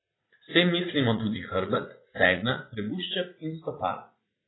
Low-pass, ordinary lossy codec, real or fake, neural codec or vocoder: 7.2 kHz; AAC, 16 kbps; fake; vocoder, 44.1 kHz, 80 mel bands, Vocos